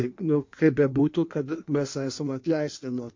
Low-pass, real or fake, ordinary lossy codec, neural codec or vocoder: 7.2 kHz; fake; MP3, 48 kbps; codec, 16 kHz in and 24 kHz out, 1.1 kbps, FireRedTTS-2 codec